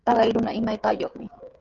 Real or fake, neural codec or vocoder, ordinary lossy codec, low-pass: fake; codec, 16 kHz, 16 kbps, FreqCodec, smaller model; Opus, 32 kbps; 7.2 kHz